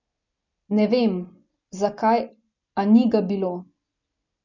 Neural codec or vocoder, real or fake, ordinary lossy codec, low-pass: none; real; none; 7.2 kHz